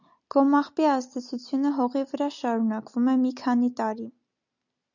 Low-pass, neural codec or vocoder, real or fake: 7.2 kHz; none; real